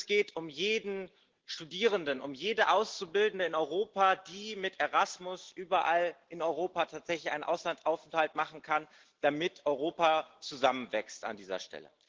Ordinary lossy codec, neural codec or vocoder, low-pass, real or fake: Opus, 16 kbps; none; 7.2 kHz; real